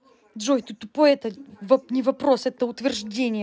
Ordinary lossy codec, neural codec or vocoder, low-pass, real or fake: none; none; none; real